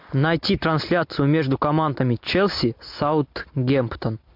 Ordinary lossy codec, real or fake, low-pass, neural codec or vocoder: MP3, 48 kbps; real; 5.4 kHz; none